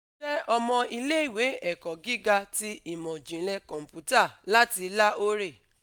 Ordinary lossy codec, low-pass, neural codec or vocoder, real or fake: none; none; none; real